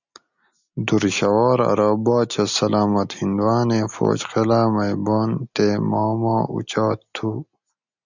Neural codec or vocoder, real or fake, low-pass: none; real; 7.2 kHz